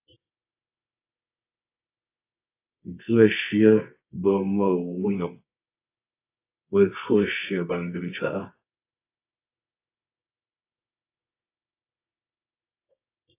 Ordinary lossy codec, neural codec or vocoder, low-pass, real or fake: AAC, 24 kbps; codec, 24 kHz, 0.9 kbps, WavTokenizer, medium music audio release; 3.6 kHz; fake